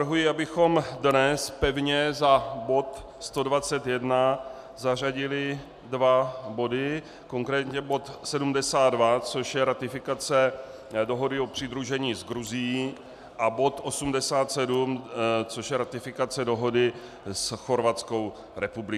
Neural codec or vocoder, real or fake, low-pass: none; real; 14.4 kHz